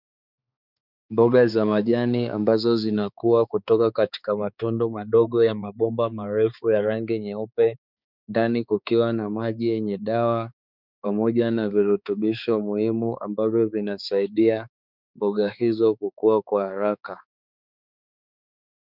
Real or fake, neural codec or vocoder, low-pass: fake; codec, 16 kHz, 4 kbps, X-Codec, HuBERT features, trained on balanced general audio; 5.4 kHz